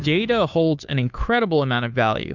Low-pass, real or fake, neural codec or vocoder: 7.2 kHz; fake; codec, 16 kHz, 2 kbps, FunCodec, trained on Chinese and English, 25 frames a second